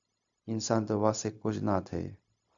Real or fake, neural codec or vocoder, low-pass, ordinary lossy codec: fake; codec, 16 kHz, 0.4 kbps, LongCat-Audio-Codec; 7.2 kHz; AAC, 64 kbps